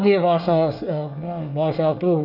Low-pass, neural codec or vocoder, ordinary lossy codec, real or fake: 5.4 kHz; codec, 24 kHz, 1 kbps, SNAC; none; fake